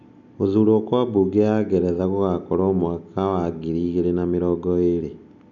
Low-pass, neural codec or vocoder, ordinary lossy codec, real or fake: 7.2 kHz; none; none; real